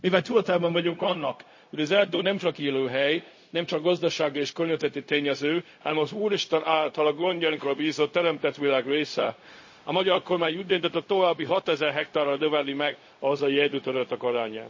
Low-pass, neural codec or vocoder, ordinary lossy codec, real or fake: 7.2 kHz; codec, 16 kHz, 0.4 kbps, LongCat-Audio-Codec; MP3, 32 kbps; fake